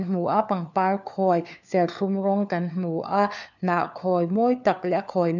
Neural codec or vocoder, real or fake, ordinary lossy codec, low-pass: codec, 16 kHz, 4 kbps, FunCodec, trained on LibriTTS, 50 frames a second; fake; none; 7.2 kHz